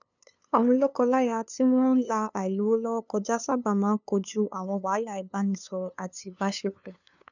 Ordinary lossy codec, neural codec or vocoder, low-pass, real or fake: none; codec, 16 kHz, 2 kbps, FunCodec, trained on LibriTTS, 25 frames a second; 7.2 kHz; fake